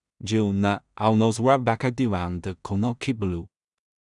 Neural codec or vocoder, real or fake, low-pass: codec, 16 kHz in and 24 kHz out, 0.4 kbps, LongCat-Audio-Codec, two codebook decoder; fake; 10.8 kHz